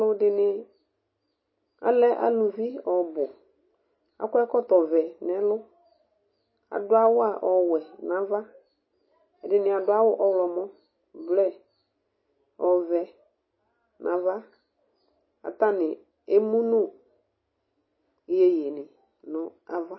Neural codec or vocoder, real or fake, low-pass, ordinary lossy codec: none; real; 7.2 kHz; MP3, 24 kbps